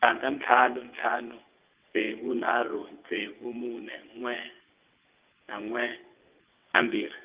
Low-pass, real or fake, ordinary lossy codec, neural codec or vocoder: 3.6 kHz; fake; Opus, 32 kbps; vocoder, 22.05 kHz, 80 mel bands, WaveNeXt